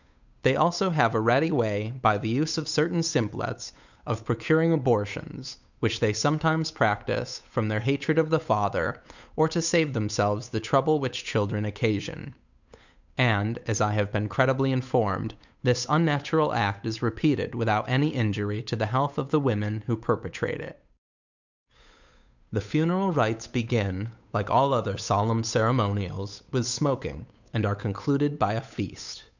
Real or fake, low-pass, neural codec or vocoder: fake; 7.2 kHz; codec, 16 kHz, 8 kbps, FunCodec, trained on Chinese and English, 25 frames a second